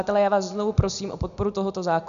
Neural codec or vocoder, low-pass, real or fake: none; 7.2 kHz; real